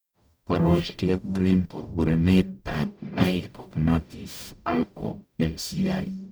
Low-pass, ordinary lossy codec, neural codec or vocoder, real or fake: none; none; codec, 44.1 kHz, 0.9 kbps, DAC; fake